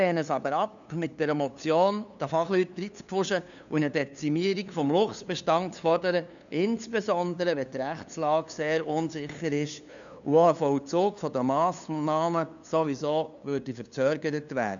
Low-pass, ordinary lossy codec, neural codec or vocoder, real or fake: 7.2 kHz; none; codec, 16 kHz, 2 kbps, FunCodec, trained on LibriTTS, 25 frames a second; fake